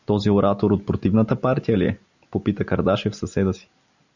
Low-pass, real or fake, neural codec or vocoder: 7.2 kHz; real; none